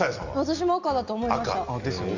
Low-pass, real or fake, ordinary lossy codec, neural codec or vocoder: 7.2 kHz; real; Opus, 64 kbps; none